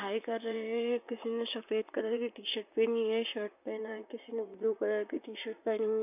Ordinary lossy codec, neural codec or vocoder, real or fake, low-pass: none; vocoder, 44.1 kHz, 128 mel bands, Pupu-Vocoder; fake; 3.6 kHz